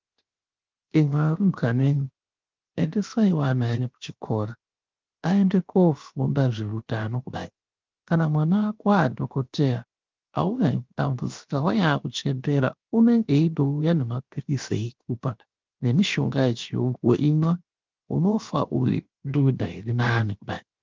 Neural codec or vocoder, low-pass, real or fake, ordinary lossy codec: codec, 16 kHz, 0.7 kbps, FocalCodec; 7.2 kHz; fake; Opus, 24 kbps